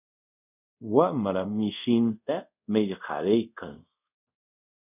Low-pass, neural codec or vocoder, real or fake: 3.6 kHz; codec, 24 kHz, 0.5 kbps, DualCodec; fake